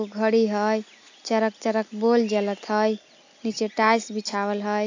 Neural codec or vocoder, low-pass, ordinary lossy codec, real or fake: none; 7.2 kHz; AAC, 48 kbps; real